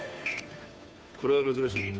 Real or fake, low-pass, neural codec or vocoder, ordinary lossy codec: fake; none; codec, 16 kHz, 2 kbps, FunCodec, trained on Chinese and English, 25 frames a second; none